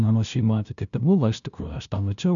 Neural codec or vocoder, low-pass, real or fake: codec, 16 kHz, 0.5 kbps, FunCodec, trained on LibriTTS, 25 frames a second; 7.2 kHz; fake